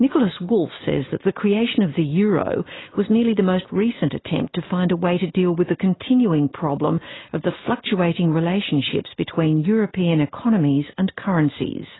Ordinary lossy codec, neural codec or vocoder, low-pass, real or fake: AAC, 16 kbps; none; 7.2 kHz; real